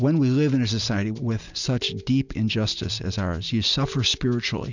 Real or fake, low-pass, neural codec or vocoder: real; 7.2 kHz; none